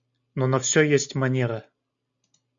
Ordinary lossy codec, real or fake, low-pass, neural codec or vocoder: MP3, 64 kbps; real; 7.2 kHz; none